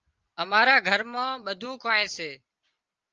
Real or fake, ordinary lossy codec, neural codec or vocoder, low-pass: real; Opus, 24 kbps; none; 7.2 kHz